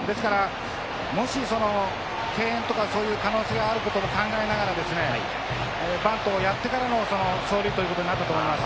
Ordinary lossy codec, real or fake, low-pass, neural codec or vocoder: none; real; none; none